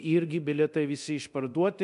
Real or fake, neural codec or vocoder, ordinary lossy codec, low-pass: fake; codec, 24 kHz, 0.9 kbps, DualCodec; MP3, 96 kbps; 10.8 kHz